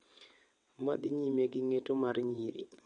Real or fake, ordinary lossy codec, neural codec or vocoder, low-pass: fake; MP3, 48 kbps; vocoder, 22.05 kHz, 80 mel bands, WaveNeXt; 9.9 kHz